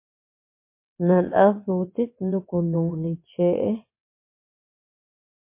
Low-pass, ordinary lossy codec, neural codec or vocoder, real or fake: 3.6 kHz; MP3, 24 kbps; vocoder, 22.05 kHz, 80 mel bands, WaveNeXt; fake